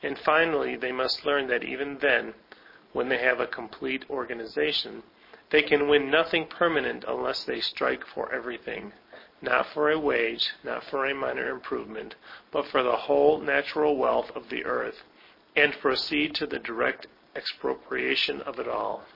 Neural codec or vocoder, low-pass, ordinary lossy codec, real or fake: none; 5.4 kHz; MP3, 24 kbps; real